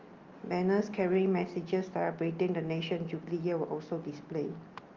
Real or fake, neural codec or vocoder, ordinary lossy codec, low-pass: real; none; Opus, 32 kbps; 7.2 kHz